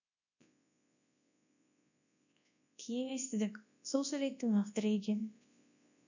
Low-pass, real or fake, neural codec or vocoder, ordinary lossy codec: 7.2 kHz; fake; codec, 24 kHz, 0.9 kbps, WavTokenizer, large speech release; none